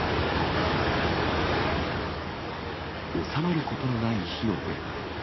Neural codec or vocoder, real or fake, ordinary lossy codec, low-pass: codec, 44.1 kHz, 7.8 kbps, DAC; fake; MP3, 24 kbps; 7.2 kHz